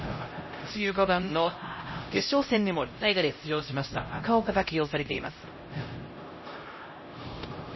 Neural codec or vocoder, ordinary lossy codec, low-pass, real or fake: codec, 16 kHz, 0.5 kbps, X-Codec, HuBERT features, trained on LibriSpeech; MP3, 24 kbps; 7.2 kHz; fake